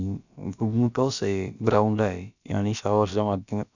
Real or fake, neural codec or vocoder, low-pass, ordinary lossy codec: fake; codec, 16 kHz, about 1 kbps, DyCAST, with the encoder's durations; 7.2 kHz; none